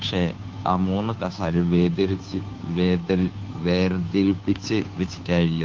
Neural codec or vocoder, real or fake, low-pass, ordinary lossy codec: codec, 16 kHz, 2 kbps, FunCodec, trained on Chinese and English, 25 frames a second; fake; 7.2 kHz; Opus, 32 kbps